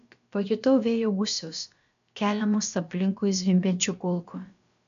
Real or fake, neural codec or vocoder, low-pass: fake; codec, 16 kHz, about 1 kbps, DyCAST, with the encoder's durations; 7.2 kHz